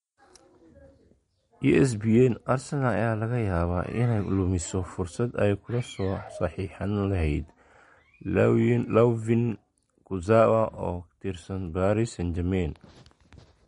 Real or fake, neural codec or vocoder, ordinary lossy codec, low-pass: real; none; MP3, 48 kbps; 19.8 kHz